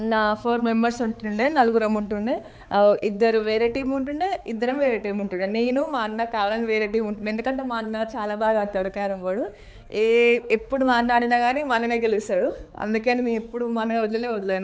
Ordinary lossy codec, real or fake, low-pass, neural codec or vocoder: none; fake; none; codec, 16 kHz, 4 kbps, X-Codec, HuBERT features, trained on balanced general audio